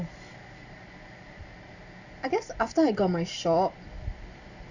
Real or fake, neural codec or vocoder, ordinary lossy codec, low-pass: real; none; none; 7.2 kHz